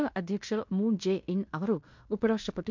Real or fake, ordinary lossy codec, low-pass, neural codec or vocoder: fake; MP3, 64 kbps; 7.2 kHz; codec, 16 kHz in and 24 kHz out, 0.9 kbps, LongCat-Audio-Codec, fine tuned four codebook decoder